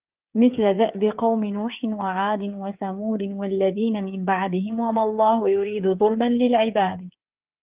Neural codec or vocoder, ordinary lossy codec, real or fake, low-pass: codec, 16 kHz, 8 kbps, FreqCodec, smaller model; Opus, 32 kbps; fake; 3.6 kHz